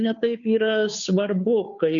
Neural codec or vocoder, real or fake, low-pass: codec, 16 kHz, 2 kbps, FunCodec, trained on Chinese and English, 25 frames a second; fake; 7.2 kHz